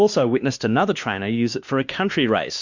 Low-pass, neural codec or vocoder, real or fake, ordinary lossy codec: 7.2 kHz; codec, 16 kHz, 2 kbps, X-Codec, WavLM features, trained on Multilingual LibriSpeech; fake; Opus, 64 kbps